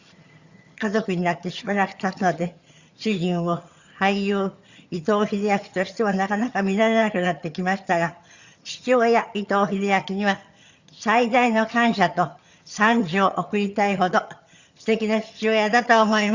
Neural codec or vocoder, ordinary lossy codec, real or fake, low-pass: vocoder, 22.05 kHz, 80 mel bands, HiFi-GAN; Opus, 64 kbps; fake; 7.2 kHz